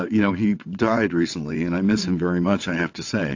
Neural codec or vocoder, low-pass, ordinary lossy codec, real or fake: vocoder, 22.05 kHz, 80 mel bands, WaveNeXt; 7.2 kHz; AAC, 48 kbps; fake